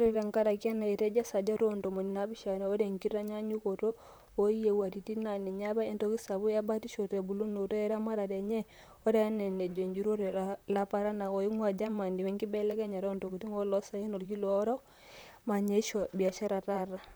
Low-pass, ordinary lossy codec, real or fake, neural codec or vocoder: none; none; fake; vocoder, 44.1 kHz, 128 mel bands, Pupu-Vocoder